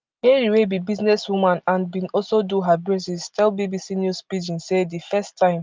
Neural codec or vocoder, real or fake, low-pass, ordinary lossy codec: none; real; 7.2 kHz; Opus, 24 kbps